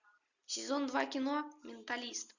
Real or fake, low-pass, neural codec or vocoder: real; 7.2 kHz; none